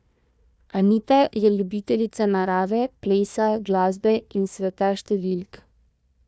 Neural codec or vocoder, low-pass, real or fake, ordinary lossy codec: codec, 16 kHz, 1 kbps, FunCodec, trained on Chinese and English, 50 frames a second; none; fake; none